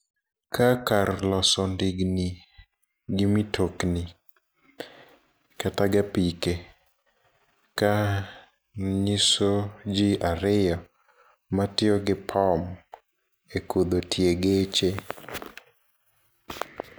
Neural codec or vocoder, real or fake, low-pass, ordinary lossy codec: none; real; none; none